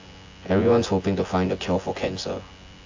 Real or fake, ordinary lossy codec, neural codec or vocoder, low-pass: fake; AAC, 48 kbps; vocoder, 24 kHz, 100 mel bands, Vocos; 7.2 kHz